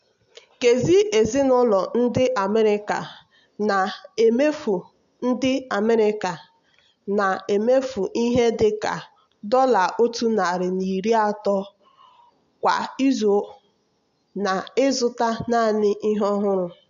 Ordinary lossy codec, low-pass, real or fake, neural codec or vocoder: none; 7.2 kHz; real; none